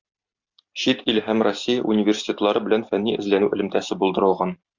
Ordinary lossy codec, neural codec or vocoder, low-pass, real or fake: Opus, 64 kbps; none; 7.2 kHz; real